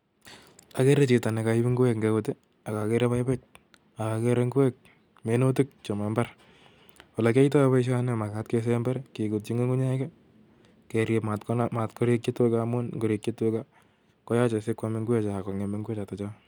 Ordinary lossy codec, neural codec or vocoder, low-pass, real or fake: none; none; none; real